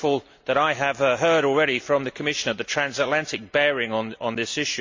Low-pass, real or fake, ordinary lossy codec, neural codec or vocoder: 7.2 kHz; real; MP3, 64 kbps; none